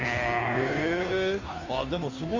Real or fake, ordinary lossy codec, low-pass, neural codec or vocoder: fake; AAC, 32 kbps; 7.2 kHz; codec, 16 kHz, 2 kbps, FunCodec, trained on Chinese and English, 25 frames a second